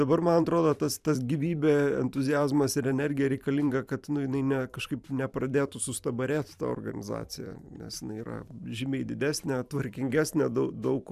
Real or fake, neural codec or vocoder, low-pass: fake; vocoder, 44.1 kHz, 128 mel bands every 512 samples, BigVGAN v2; 14.4 kHz